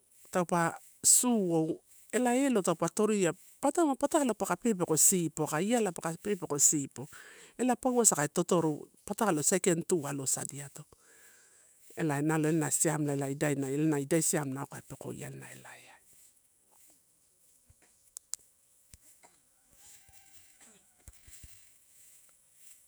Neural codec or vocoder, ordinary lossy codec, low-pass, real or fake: autoencoder, 48 kHz, 128 numbers a frame, DAC-VAE, trained on Japanese speech; none; none; fake